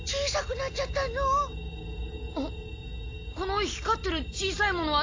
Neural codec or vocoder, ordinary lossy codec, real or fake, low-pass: none; AAC, 32 kbps; real; 7.2 kHz